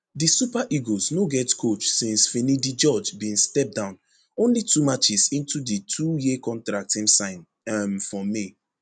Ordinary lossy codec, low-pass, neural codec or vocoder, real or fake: none; 9.9 kHz; none; real